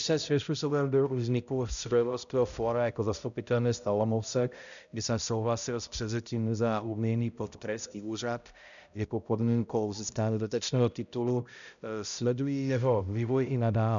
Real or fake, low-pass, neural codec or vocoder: fake; 7.2 kHz; codec, 16 kHz, 0.5 kbps, X-Codec, HuBERT features, trained on balanced general audio